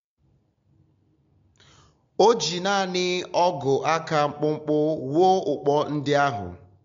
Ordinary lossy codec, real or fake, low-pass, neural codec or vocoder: MP3, 48 kbps; real; 7.2 kHz; none